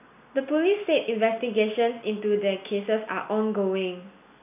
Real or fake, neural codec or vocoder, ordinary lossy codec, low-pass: real; none; none; 3.6 kHz